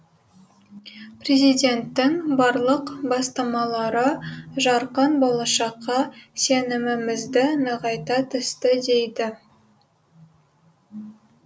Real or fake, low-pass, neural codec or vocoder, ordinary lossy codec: real; none; none; none